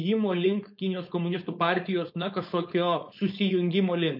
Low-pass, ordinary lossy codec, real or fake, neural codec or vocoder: 5.4 kHz; MP3, 32 kbps; fake; codec, 16 kHz, 4.8 kbps, FACodec